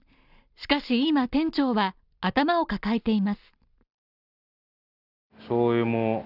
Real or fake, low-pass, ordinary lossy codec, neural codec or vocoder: real; 5.4 kHz; none; none